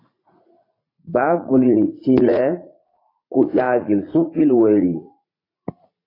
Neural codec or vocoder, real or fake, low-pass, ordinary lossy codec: vocoder, 44.1 kHz, 80 mel bands, Vocos; fake; 5.4 kHz; AAC, 24 kbps